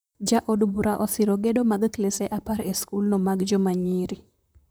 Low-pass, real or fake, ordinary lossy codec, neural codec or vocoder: none; fake; none; vocoder, 44.1 kHz, 128 mel bands, Pupu-Vocoder